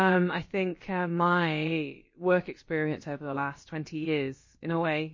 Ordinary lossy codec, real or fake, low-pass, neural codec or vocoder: MP3, 32 kbps; fake; 7.2 kHz; codec, 16 kHz, about 1 kbps, DyCAST, with the encoder's durations